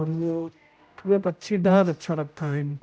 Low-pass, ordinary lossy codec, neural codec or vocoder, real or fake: none; none; codec, 16 kHz, 0.5 kbps, X-Codec, HuBERT features, trained on general audio; fake